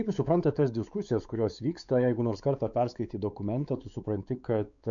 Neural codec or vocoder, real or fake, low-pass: codec, 16 kHz, 4 kbps, X-Codec, WavLM features, trained on Multilingual LibriSpeech; fake; 7.2 kHz